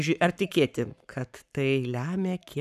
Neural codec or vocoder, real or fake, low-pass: codec, 44.1 kHz, 7.8 kbps, Pupu-Codec; fake; 14.4 kHz